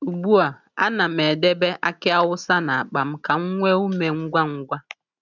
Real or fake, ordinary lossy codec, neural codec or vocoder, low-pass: real; none; none; 7.2 kHz